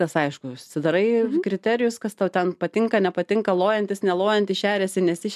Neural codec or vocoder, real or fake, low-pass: none; real; 14.4 kHz